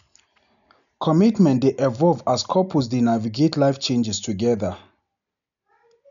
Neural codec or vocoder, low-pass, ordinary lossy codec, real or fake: none; 7.2 kHz; none; real